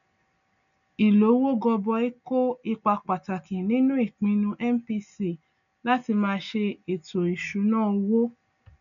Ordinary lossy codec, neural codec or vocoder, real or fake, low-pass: none; none; real; 7.2 kHz